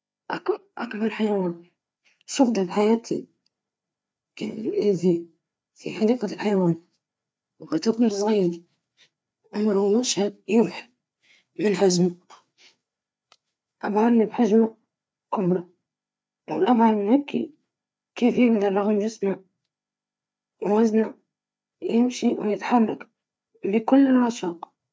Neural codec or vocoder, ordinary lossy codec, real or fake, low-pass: codec, 16 kHz, 4 kbps, FreqCodec, larger model; none; fake; none